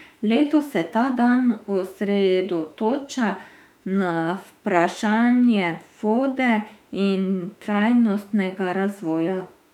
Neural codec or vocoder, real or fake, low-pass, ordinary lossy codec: autoencoder, 48 kHz, 32 numbers a frame, DAC-VAE, trained on Japanese speech; fake; 19.8 kHz; none